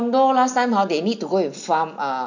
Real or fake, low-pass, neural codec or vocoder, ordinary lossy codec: real; 7.2 kHz; none; none